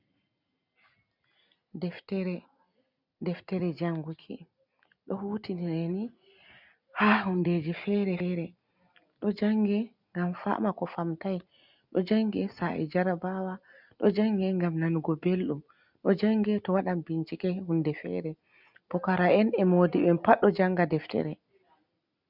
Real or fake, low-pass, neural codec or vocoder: real; 5.4 kHz; none